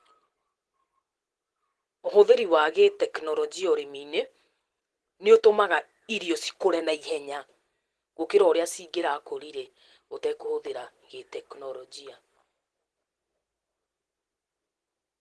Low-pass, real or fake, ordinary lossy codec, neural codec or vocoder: 10.8 kHz; real; Opus, 16 kbps; none